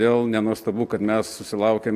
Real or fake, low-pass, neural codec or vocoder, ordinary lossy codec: real; 14.4 kHz; none; Opus, 64 kbps